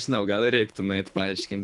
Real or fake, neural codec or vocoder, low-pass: fake; codec, 24 kHz, 3 kbps, HILCodec; 10.8 kHz